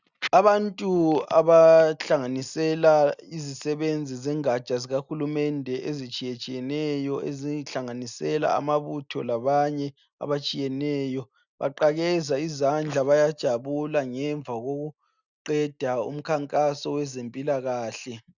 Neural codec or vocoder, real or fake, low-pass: none; real; 7.2 kHz